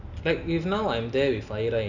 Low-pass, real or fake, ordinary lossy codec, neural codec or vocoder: 7.2 kHz; real; none; none